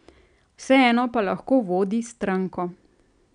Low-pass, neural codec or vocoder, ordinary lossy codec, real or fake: 9.9 kHz; none; none; real